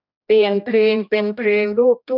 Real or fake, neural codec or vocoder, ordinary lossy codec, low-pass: fake; codec, 16 kHz, 1 kbps, X-Codec, HuBERT features, trained on general audio; none; 5.4 kHz